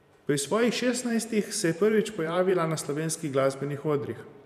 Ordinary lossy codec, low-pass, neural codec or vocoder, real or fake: none; 14.4 kHz; vocoder, 44.1 kHz, 128 mel bands every 512 samples, BigVGAN v2; fake